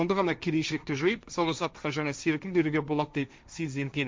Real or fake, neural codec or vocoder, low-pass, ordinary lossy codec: fake; codec, 16 kHz, 1.1 kbps, Voila-Tokenizer; none; none